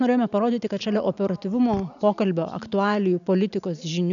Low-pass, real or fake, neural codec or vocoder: 7.2 kHz; real; none